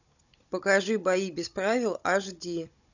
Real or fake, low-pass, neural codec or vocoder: fake; 7.2 kHz; codec, 16 kHz, 16 kbps, FunCodec, trained on Chinese and English, 50 frames a second